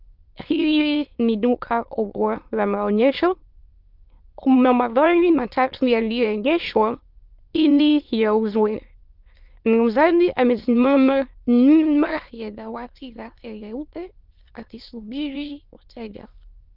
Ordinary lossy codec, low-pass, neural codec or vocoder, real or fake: Opus, 24 kbps; 5.4 kHz; autoencoder, 22.05 kHz, a latent of 192 numbers a frame, VITS, trained on many speakers; fake